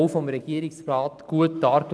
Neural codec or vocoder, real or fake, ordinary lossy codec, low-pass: none; real; none; none